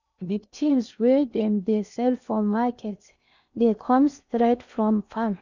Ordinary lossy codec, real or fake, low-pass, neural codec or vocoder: none; fake; 7.2 kHz; codec, 16 kHz in and 24 kHz out, 0.8 kbps, FocalCodec, streaming, 65536 codes